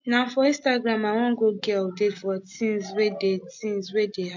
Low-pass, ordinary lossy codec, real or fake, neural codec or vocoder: 7.2 kHz; MP3, 48 kbps; real; none